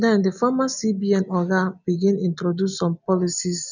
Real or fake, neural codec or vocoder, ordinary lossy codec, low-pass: real; none; none; 7.2 kHz